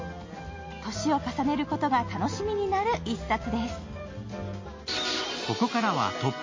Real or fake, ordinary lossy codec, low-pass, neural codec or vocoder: real; MP3, 32 kbps; 7.2 kHz; none